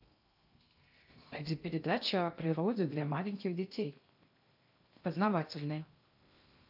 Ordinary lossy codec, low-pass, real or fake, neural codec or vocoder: AAC, 48 kbps; 5.4 kHz; fake; codec, 16 kHz in and 24 kHz out, 0.6 kbps, FocalCodec, streaming, 2048 codes